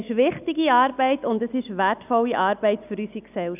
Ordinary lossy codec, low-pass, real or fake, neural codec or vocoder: none; 3.6 kHz; real; none